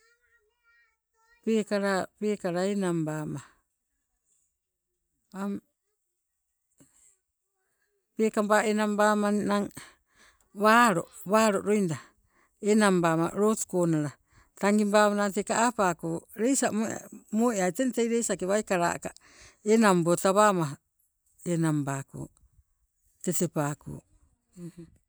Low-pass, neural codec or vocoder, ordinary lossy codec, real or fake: none; none; none; real